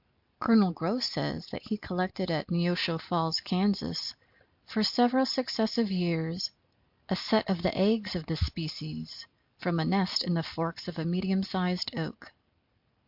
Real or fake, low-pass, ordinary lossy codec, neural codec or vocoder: real; 5.4 kHz; MP3, 48 kbps; none